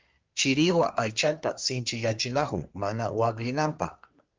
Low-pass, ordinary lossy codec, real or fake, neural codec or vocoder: 7.2 kHz; Opus, 24 kbps; fake; codec, 24 kHz, 1 kbps, SNAC